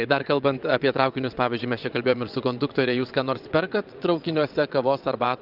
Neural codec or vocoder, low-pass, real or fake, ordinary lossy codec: vocoder, 22.05 kHz, 80 mel bands, WaveNeXt; 5.4 kHz; fake; Opus, 24 kbps